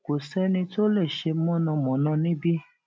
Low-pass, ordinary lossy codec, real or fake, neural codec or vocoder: none; none; real; none